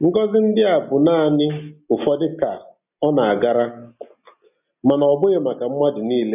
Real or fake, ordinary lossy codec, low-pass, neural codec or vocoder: real; none; 3.6 kHz; none